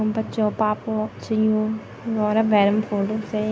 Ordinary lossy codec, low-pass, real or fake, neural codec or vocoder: none; none; real; none